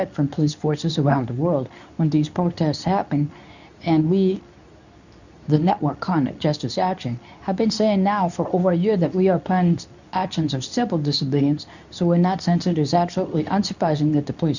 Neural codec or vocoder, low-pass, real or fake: codec, 24 kHz, 0.9 kbps, WavTokenizer, medium speech release version 2; 7.2 kHz; fake